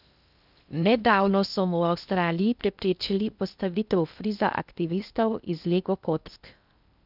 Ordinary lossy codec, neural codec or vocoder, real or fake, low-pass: none; codec, 16 kHz in and 24 kHz out, 0.6 kbps, FocalCodec, streaming, 2048 codes; fake; 5.4 kHz